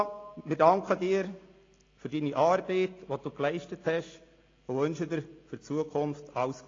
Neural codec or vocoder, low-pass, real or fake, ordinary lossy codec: none; 7.2 kHz; real; AAC, 32 kbps